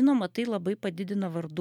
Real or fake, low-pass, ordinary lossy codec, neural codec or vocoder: real; 19.8 kHz; MP3, 96 kbps; none